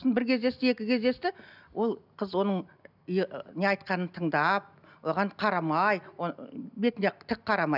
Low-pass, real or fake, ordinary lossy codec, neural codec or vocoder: 5.4 kHz; real; none; none